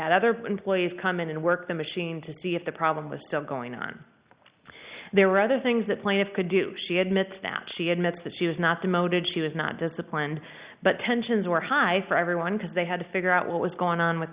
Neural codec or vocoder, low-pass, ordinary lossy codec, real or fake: none; 3.6 kHz; Opus, 64 kbps; real